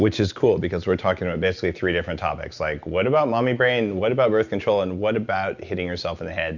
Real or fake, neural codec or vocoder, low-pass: real; none; 7.2 kHz